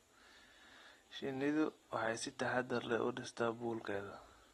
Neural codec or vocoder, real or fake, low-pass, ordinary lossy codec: none; real; 14.4 kHz; AAC, 32 kbps